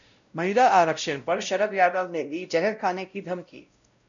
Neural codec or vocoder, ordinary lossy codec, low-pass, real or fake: codec, 16 kHz, 0.5 kbps, X-Codec, WavLM features, trained on Multilingual LibriSpeech; MP3, 96 kbps; 7.2 kHz; fake